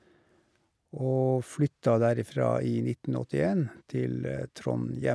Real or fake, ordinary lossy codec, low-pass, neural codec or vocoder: real; none; none; none